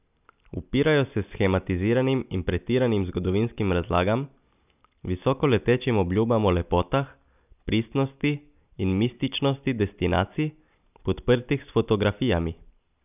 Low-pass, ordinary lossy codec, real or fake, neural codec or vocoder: 3.6 kHz; none; real; none